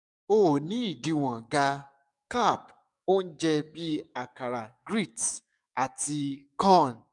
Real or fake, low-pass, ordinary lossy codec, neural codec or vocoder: fake; 10.8 kHz; none; codec, 44.1 kHz, 7.8 kbps, DAC